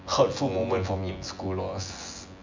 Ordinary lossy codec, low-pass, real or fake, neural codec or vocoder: MP3, 48 kbps; 7.2 kHz; fake; vocoder, 24 kHz, 100 mel bands, Vocos